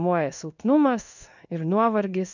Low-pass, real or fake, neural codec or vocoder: 7.2 kHz; fake; codec, 16 kHz in and 24 kHz out, 1 kbps, XY-Tokenizer